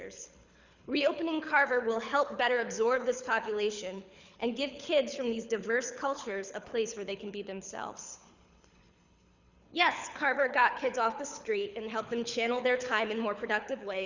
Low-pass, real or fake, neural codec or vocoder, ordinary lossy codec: 7.2 kHz; fake; codec, 24 kHz, 6 kbps, HILCodec; Opus, 64 kbps